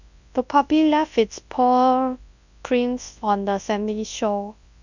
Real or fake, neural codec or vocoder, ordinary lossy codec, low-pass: fake; codec, 24 kHz, 0.9 kbps, WavTokenizer, large speech release; none; 7.2 kHz